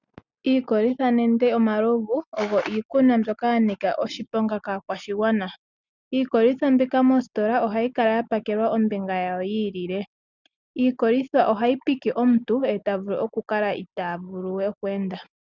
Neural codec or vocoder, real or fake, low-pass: none; real; 7.2 kHz